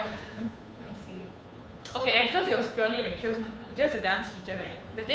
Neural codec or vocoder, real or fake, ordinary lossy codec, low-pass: codec, 16 kHz, 2 kbps, FunCodec, trained on Chinese and English, 25 frames a second; fake; none; none